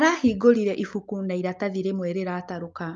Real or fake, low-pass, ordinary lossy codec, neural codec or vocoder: real; 7.2 kHz; Opus, 32 kbps; none